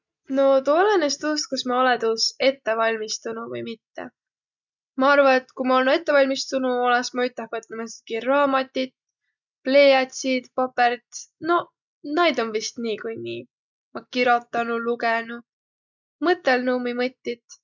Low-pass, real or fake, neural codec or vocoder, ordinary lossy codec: 7.2 kHz; real; none; none